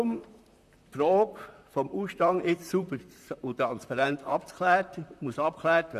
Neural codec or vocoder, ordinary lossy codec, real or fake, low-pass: vocoder, 44.1 kHz, 128 mel bands, Pupu-Vocoder; none; fake; 14.4 kHz